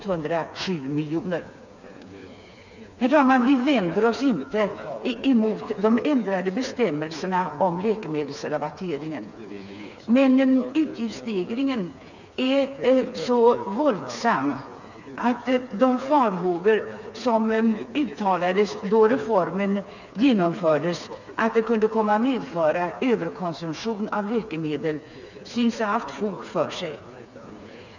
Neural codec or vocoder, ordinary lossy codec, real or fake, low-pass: codec, 16 kHz, 4 kbps, FreqCodec, smaller model; none; fake; 7.2 kHz